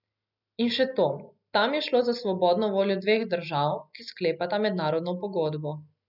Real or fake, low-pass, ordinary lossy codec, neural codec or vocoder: real; 5.4 kHz; none; none